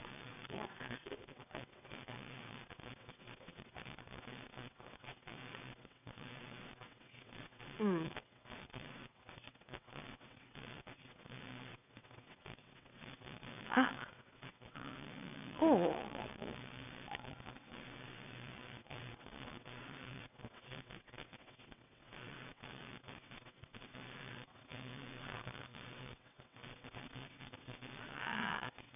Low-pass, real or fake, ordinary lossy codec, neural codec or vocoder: 3.6 kHz; fake; none; vocoder, 22.05 kHz, 80 mel bands, WaveNeXt